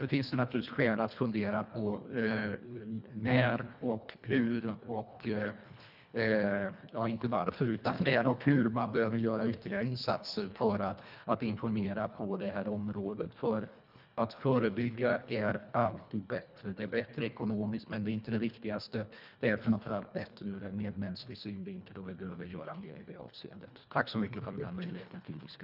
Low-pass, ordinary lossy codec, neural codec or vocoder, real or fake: 5.4 kHz; none; codec, 24 kHz, 1.5 kbps, HILCodec; fake